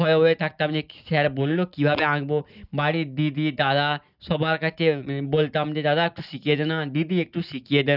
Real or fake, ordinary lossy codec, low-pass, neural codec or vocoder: real; none; 5.4 kHz; none